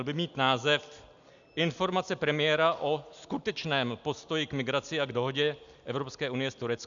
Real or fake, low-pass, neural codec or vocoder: real; 7.2 kHz; none